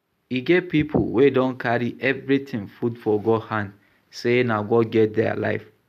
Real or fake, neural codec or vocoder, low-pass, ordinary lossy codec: real; none; 14.4 kHz; none